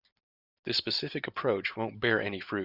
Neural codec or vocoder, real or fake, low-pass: none; real; 5.4 kHz